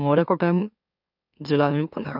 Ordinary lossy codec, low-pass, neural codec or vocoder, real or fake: none; 5.4 kHz; autoencoder, 44.1 kHz, a latent of 192 numbers a frame, MeloTTS; fake